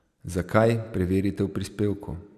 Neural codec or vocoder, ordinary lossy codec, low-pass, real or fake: none; none; 14.4 kHz; real